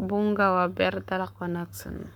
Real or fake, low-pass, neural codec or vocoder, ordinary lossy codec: fake; 19.8 kHz; codec, 44.1 kHz, 7.8 kbps, Pupu-Codec; none